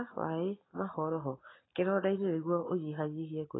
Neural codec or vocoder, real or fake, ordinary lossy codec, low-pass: none; real; AAC, 16 kbps; 7.2 kHz